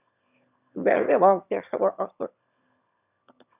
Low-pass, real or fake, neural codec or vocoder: 3.6 kHz; fake; autoencoder, 22.05 kHz, a latent of 192 numbers a frame, VITS, trained on one speaker